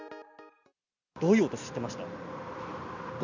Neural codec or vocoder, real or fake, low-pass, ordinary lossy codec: none; real; 7.2 kHz; none